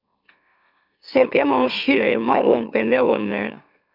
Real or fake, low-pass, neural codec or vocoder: fake; 5.4 kHz; autoencoder, 44.1 kHz, a latent of 192 numbers a frame, MeloTTS